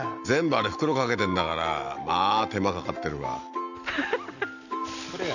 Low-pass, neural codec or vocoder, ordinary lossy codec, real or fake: 7.2 kHz; none; none; real